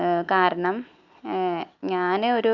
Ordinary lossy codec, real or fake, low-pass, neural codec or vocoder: none; real; 7.2 kHz; none